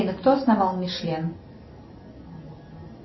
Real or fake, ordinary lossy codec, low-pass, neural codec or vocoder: real; MP3, 24 kbps; 7.2 kHz; none